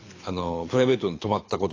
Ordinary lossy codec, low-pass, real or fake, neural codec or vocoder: AAC, 32 kbps; 7.2 kHz; fake; codec, 24 kHz, 6 kbps, HILCodec